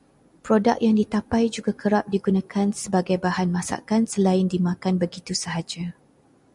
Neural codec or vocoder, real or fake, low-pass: none; real; 10.8 kHz